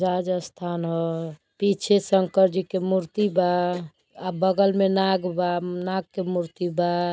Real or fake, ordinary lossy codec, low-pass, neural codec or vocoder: real; none; none; none